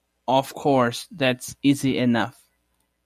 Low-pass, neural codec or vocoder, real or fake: 14.4 kHz; none; real